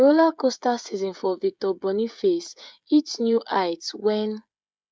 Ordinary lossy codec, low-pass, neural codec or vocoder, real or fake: none; none; codec, 16 kHz, 16 kbps, FreqCodec, smaller model; fake